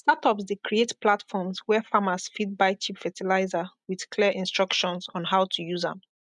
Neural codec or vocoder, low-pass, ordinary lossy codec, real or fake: none; 10.8 kHz; MP3, 96 kbps; real